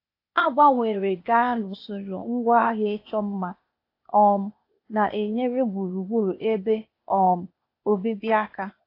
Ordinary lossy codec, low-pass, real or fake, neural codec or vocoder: AAC, 32 kbps; 5.4 kHz; fake; codec, 16 kHz, 0.8 kbps, ZipCodec